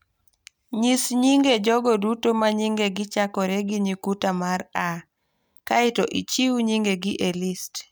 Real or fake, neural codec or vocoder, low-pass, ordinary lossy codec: real; none; none; none